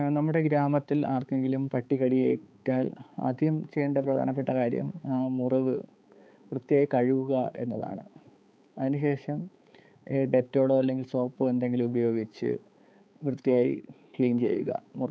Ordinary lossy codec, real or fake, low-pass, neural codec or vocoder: none; fake; none; codec, 16 kHz, 4 kbps, X-Codec, HuBERT features, trained on balanced general audio